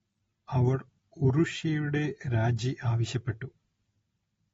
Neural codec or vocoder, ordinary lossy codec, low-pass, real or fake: none; AAC, 24 kbps; 19.8 kHz; real